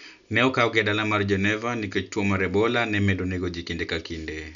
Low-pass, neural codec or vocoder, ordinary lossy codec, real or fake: 7.2 kHz; none; none; real